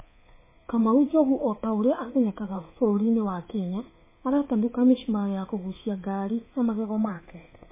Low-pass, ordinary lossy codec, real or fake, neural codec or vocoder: 3.6 kHz; MP3, 16 kbps; fake; codec, 24 kHz, 3.1 kbps, DualCodec